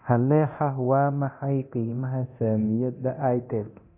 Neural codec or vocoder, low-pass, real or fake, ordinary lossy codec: codec, 24 kHz, 0.9 kbps, DualCodec; 3.6 kHz; fake; none